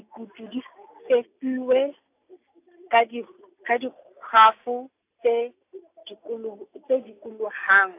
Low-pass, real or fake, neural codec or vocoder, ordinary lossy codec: 3.6 kHz; real; none; AAC, 24 kbps